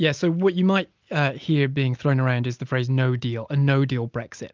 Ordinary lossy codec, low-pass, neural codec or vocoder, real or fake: Opus, 24 kbps; 7.2 kHz; none; real